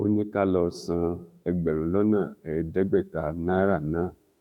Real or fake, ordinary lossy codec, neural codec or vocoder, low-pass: fake; none; autoencoder, 48 kHz, 32 numbers a frame, DAC-VAE, trained on Japanese speech; 19.8 kHz